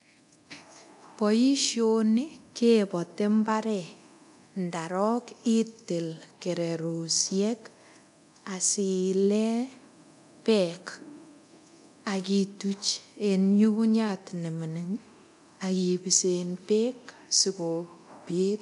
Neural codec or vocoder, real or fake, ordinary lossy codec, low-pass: codec, 24 kHz, 0.9 kbps, DualCodec; fake; none; 10.8 kHz